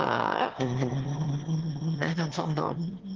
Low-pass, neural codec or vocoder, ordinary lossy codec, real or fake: 7.2 kHz; autoencoder, 22.05 kHz, a latent of 192 numbers a frame, VITS, trained on one speaker; Opus, 16 kbps; fake